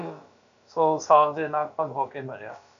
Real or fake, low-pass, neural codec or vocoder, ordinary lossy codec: fake; 7.2 kHz; codec, 16 kHz, about 1 kbps, DyCAST, with the encoder's durations; MP3, 64 kbps